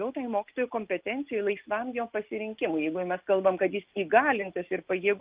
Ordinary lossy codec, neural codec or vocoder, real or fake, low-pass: Opus, 24 kbps; none; real; 3.6 kHz